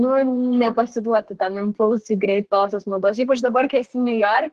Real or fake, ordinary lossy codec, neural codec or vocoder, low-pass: fake; Opus, 16 kbps; codec, 32 kHz, 1.9 kbps, SNAC; 14.4 kHz